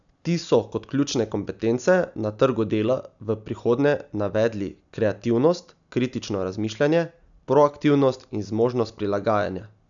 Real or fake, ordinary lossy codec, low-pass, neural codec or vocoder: real; none; 7.2 kHz; none